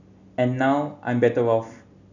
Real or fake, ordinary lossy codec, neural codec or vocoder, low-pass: real; none; none; 7.2 kHz